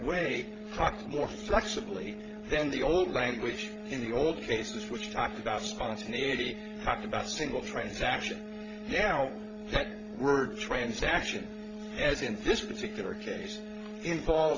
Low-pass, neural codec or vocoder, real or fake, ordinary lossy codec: 7.2 kHz; codec, 16 kHz, 16 kbps, FreqCodec, larger model; fake; Opus, 32 kbps